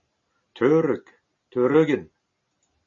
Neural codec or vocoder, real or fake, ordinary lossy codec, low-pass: none; real; MP3, 32 kbps; 7.2 kHz